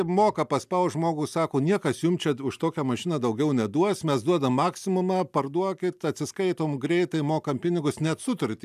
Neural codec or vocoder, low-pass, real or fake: none; 14.4 kHz; real